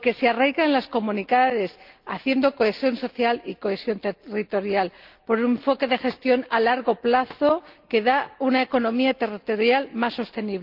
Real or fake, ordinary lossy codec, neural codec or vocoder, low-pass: real; Opus, 32 kbps; none; 5.4 kHz